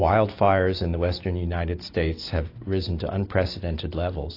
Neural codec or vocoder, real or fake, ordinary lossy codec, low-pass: none; real; AAC, 32 kbps; 5.4 kHz